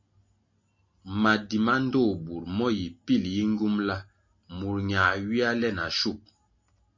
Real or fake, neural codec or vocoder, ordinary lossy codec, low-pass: real; none; MP3, 32 kbps; 7.2 kHz